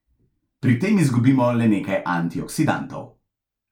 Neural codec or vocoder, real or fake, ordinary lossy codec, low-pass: none; real; none; 19.8 kHz